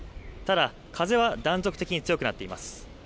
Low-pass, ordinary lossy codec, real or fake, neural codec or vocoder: none; none; real; none